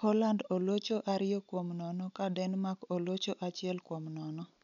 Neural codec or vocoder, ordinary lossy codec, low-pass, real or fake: none; none; 7.2 kHz; real